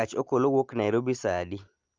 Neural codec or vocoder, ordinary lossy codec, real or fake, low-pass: none; Opus, 24 kbps; real; 7.2 kHz